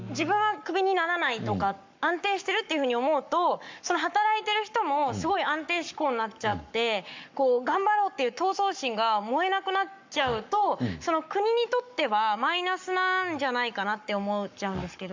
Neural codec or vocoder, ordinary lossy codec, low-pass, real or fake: codec, 44.1 kHz, 7.8 kbps, Pupu-Codec; MP3, 64 kbps; 7.2 kHz; fake